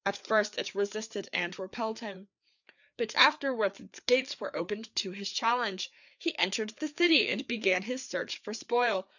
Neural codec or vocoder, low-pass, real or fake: codec, 16 kHz, 4 kbps, FreqCodec, larger model; 7.2 kHz; fake